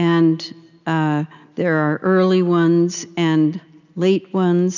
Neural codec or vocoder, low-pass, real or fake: none; 7.2 kHz; real